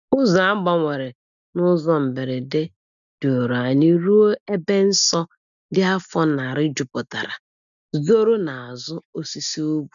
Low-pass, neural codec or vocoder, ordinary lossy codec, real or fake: 7.2 kHz; none; none; real